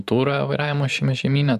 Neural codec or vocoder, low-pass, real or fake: vocoder, 44.1 kHz, 128 mel bands every 512 samples, BigVGAN v2; 14.4 kHz; fake